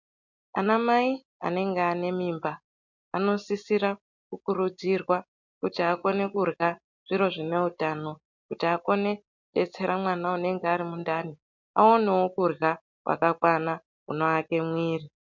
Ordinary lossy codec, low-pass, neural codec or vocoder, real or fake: MP3, 64 kbps; 7.2 kHz; none; real